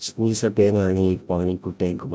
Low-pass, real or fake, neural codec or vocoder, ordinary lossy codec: none; fake; codec, 16 kHz, 0.5 kbps, FreqCodec, larger model; none